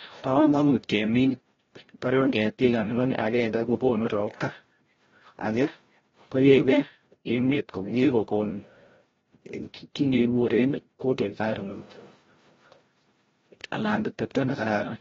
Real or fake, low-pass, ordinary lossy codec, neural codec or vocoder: fake; 7.2 kHz; AAC, 24 kbps; codec, 16 kHz, 0.5 kbps, FreqCodec, larger model